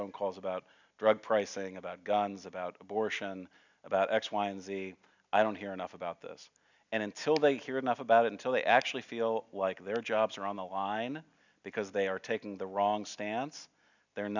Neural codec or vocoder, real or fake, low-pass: none; real; 7.2 kHz